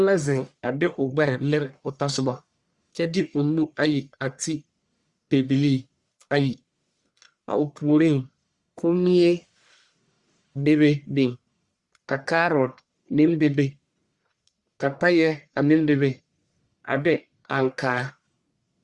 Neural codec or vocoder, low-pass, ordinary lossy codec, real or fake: codec, 44.1 kHz, 1.7 kbps, Pupu-Codec; 10.8 kHz; Opus, 64 kbps; fake